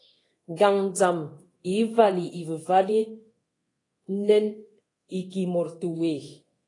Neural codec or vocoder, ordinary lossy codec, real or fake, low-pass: codec, 24 kHz, 0.9 kbps, DualCodec; AAC, 32 kbps; fake; 10.8 kHz